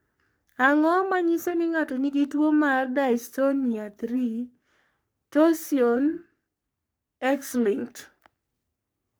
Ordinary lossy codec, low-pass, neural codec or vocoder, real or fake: none; none; codec, 44.1 kHz, 3.4 kbps, Pupu-Codec; fake